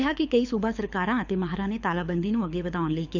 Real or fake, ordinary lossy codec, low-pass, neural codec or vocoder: fake; none; 7.2 kHz; codec, 24 kHz, 6 kbps, HILCodec